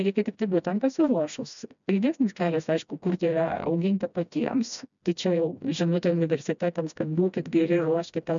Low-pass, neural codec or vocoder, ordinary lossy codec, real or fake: 7.2 kHz; codec, 16 kHz, 1 kbps, FreqCodec, smaller model; MP3, 96 kbps; fake